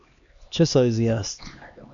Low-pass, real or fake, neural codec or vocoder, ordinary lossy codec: 7.2 kHz; fake; codec, 16 kHz, 2 kbps, X-Codec, HuBERT features, trained on LibriSpeech; AAC, 64 kbps